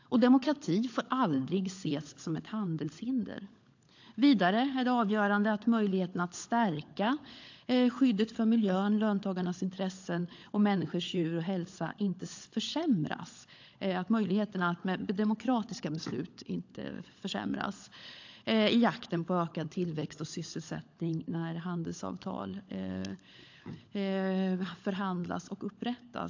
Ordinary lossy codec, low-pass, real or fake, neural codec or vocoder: AAC, 48 kbps; 7.2 kHz; fake; codec, 16 kHz, 16 kbps, FunCodec, trained on LibriTTS, 50 frames a second